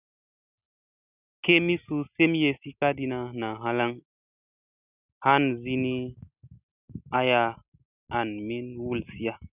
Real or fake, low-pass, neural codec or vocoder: real; 3.6 kHz; none